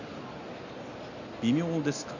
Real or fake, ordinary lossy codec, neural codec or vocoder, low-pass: real; none; none; 7.2 kHz